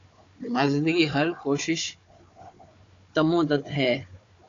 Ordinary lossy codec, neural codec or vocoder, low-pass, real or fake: AAC, 48 kbps; codec, 16 kHz, 4 kbps, FunCodec, trained on Chinese and English, 50 frames a second; 7.2 kHz; fake